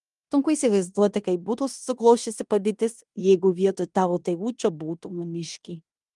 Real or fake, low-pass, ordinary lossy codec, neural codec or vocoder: fake; 10.8 kHz; Opus, 32 kbps; codec, 16 kHz in and 24 kHz out, 0.9 kbps, LongCat-Audio-Codec, fine tuned four codebook decoder